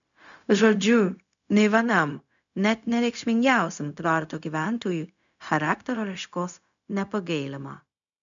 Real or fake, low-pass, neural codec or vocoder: fake; 7.2 kHz; codec, 16 kHz, 0.4 kbps, LongCat-Audio-Codec